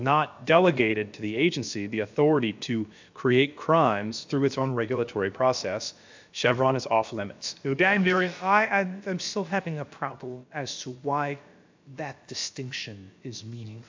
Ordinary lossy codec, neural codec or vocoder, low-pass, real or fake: MP3, 64 kbps; codec, 16 kHz, about 1 kbps, DyCAST, with the encoder's durations; 7.2 kHz; fake